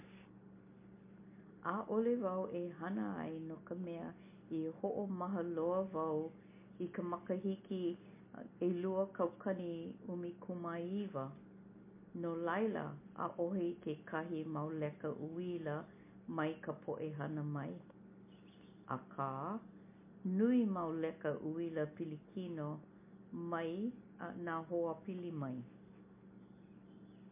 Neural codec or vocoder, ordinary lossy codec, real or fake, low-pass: none; MP3, 24 kbps; real; 3.6 kHz